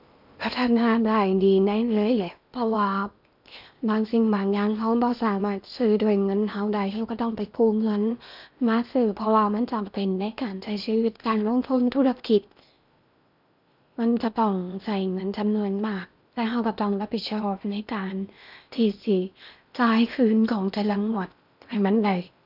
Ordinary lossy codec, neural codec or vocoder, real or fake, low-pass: none; codec, 16 kHz in and 24 kHz out, 0.8 kbps, FocalCodec, streaming, 65536 codes; fake; 5.4 kHz